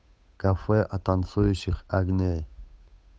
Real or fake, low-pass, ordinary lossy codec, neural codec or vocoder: fake; none; none; codec, 16 kHz, 8 kbps, FunCodec, trained on Chinese and English, 25 frames a second